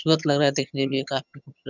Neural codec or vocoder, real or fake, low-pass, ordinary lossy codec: vocoder, 22.05 kHz, 80 mel bands, HiFi-GAN; fake; 7.2 kHz; none